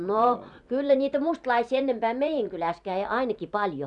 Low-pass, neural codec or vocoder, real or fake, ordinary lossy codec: 10.8 kHz; vocoder, 44.1 kHz, 128 mel bands every 256 samples, BigVGAN v2; fake; none